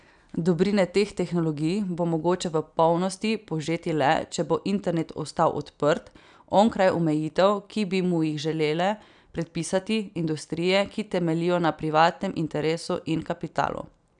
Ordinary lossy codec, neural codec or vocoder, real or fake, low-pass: none; none; real; 9.9 kHz